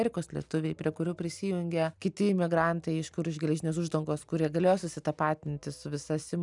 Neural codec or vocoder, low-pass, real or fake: none; 10.8 kHz; real